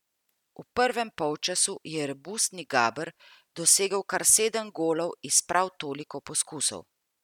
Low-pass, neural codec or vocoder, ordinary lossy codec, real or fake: 19.8 kHz; none; none; real